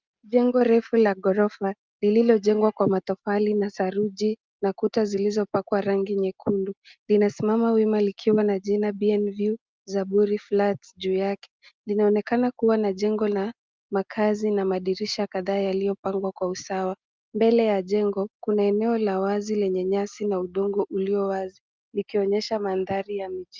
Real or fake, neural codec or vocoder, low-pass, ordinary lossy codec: real; none; 7.2 kHz; Opus, 32 kbps